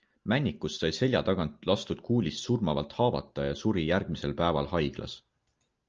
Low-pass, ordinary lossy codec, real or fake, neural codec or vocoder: 7.2 kHz; Opus, 24 kbps; real; none